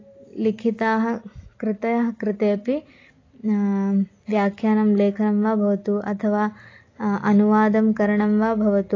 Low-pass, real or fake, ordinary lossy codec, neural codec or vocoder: 7.2 kHz; real; AAC, 32 kbps; none